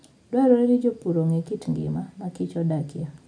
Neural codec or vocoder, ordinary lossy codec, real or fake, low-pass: none; none; real; 9.9 kHz